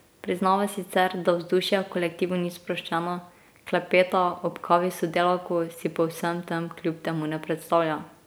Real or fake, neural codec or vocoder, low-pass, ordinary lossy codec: real; none; none; none